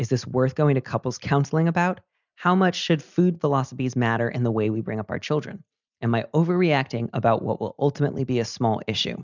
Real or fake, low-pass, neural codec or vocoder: real; 7.2 kHz; none